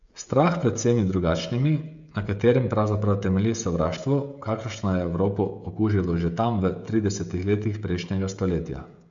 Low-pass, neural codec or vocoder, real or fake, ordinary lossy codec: 7.2 kHz; codec, 16 kHz, 16 kbps, FreqCodec, smaller model; fake; MP3, 64 kbps